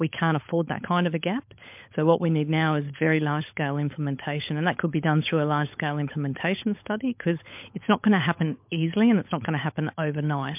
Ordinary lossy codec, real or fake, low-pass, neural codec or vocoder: MP3, 32 kbps; fake; 3.6 kHz; codec, 16 kHz, 16 kbps, FunCodec, trained on LibriTTS, 50 frames a second